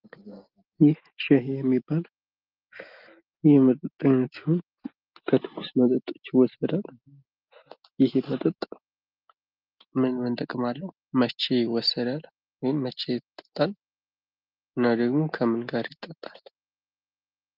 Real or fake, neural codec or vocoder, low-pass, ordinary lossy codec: real; none; 5.4 kHz; Opus, 32 kbps